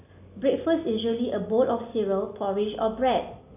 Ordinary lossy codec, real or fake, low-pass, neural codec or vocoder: none; real; 3.6 kHz; none